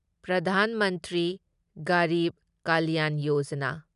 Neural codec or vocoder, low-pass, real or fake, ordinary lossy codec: none; 10.8 kHz; real; none